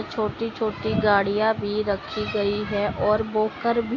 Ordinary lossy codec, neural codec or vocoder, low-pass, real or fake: AAC, 32 kbps; none; 7.2 kHz; real